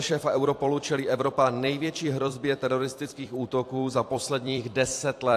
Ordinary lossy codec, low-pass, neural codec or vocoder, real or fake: AAC, 64 kbps; 14.4 kHz; vocoder, 44.1 kHz, 128 mel bands every 256 samples, BigVGAN v2; fake